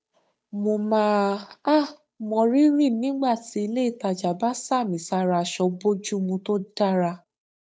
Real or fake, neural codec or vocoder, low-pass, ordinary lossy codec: fake; codec, 16 kHz, 8 kbps, FunCodec, trained on Chinese and English, 25 frames a second; none; none